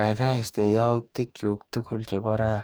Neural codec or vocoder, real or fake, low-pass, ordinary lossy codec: codec, 44.1 kHz, 2.6 kbps, DAC; fake; none; none